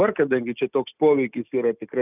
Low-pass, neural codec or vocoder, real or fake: 3.6 kHz; none; real